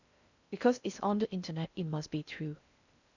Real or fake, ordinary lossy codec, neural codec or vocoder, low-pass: fake; none; codec, 16 kHz in and 24 kHz out, 0.6 kbps, FocalCodec, streaming, 2048 codes; 7.2 kHz